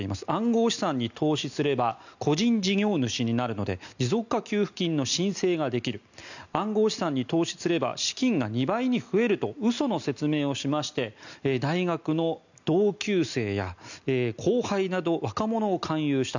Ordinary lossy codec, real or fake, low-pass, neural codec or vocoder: none; real; 7.2 kHz; none